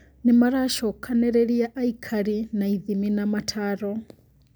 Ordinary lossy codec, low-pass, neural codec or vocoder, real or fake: none; none; none; real